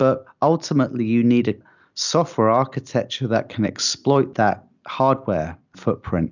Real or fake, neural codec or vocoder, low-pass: real; none; 7.2 kHz